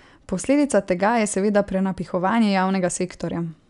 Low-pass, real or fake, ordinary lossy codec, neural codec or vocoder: 10.8 kHz; real; none; none